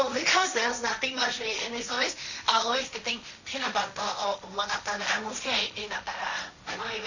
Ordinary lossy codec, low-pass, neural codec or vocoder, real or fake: none; 7.2 kHz; codec, 16 kHz, 1.1 kbps, Voila-Tokenizer; fake